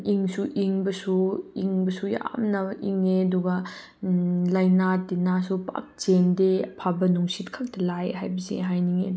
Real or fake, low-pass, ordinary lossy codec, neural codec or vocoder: real; none; none; none